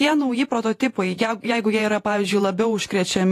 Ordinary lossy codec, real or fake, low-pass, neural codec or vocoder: AAC, 48 kbps; fake; 14.4 kHz; vocoder, 48 kHz, 128 mel bands, Vocos